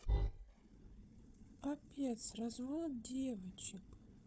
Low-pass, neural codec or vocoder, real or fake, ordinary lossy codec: none; codec, 16 kHz, 4 kbps, FunCodec, trained on Chinese and English, 50 frames a second; fake; none